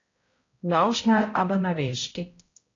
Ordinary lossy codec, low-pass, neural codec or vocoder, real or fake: AAC, 32 kbps; 7.2 kHz; codec, 16 kHz, 0.5 kbps, X-Codec, HuBERT features, trained on balanced general audio; fake